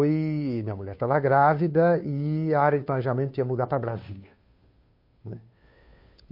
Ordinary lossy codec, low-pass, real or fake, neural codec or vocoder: MP3, 32 kbps; 5.4 kHz; fake; codec, 16 kHz, 2 kbps, FunCodec, trained on Chinese and English, 25 frames a second